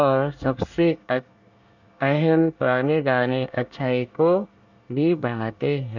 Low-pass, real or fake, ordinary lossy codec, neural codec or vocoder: 7.2 kHz; fake; none; codec, 24 kHz, 1 kbps, SNAC